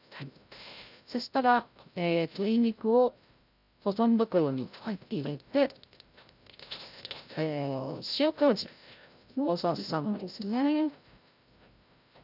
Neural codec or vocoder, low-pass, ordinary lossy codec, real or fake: codec, 16 kHz, 0.5 kbps, FreqCodec, larger model; 5.4 kHz; none; fake